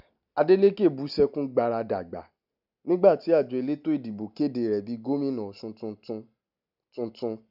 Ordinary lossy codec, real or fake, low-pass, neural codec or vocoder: none; real; 5.4 kHz; none